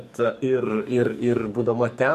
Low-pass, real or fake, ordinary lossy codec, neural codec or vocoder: 14.4 kHz; fake; MP3, 64 kbps; codec, 44.1 kHz, 2.6 kbps, SNAC